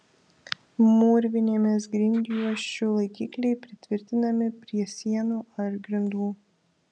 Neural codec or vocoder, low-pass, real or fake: none; 9.9 kHz; real